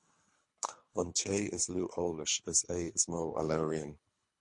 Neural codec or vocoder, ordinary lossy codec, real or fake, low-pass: codec, 24 kHz, 3 kbps, HILCodec; MP3, 48 kbps; fake; 10.8 kHz